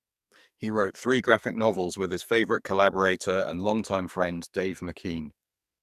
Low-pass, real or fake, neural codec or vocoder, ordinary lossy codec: 14.4 kHz; fake; codec, 44.1 kHz, 2.6 kbps, SNAC; none